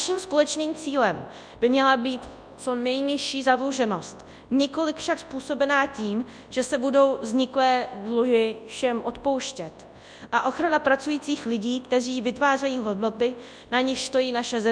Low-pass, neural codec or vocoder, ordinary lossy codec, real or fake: 9.9 kHz; codec, 24 kHz, 0.9 kbps, WavTokenizer, large speech release; MP3, 96 kbps; fake